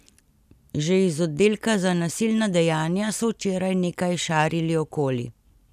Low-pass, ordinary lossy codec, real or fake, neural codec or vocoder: 14.4 kHz; none; real; none